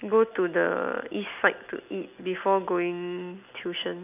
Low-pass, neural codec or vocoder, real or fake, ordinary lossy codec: 3.6 kHz; none; real; none